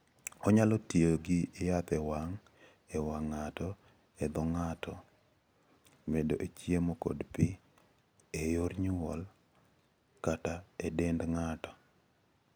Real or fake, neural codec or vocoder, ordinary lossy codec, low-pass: real; none; none; none